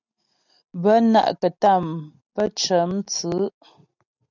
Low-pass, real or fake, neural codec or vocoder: 7.2 kHz; real; none